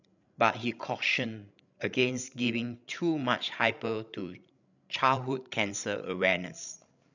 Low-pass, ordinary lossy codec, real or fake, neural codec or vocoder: 7.2 kHz; none; fake; codec, 16 kHz, 16 kbps, FreqCodec, larger model